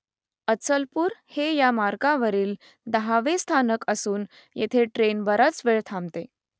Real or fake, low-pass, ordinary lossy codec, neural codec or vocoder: real; none; none; none